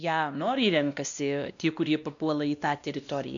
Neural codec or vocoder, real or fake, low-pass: codec, 16 kHz, 1 kbps, X-Codec, WavLM features, trained on Multilingual LibriSpeech; fake; 7.2 kHz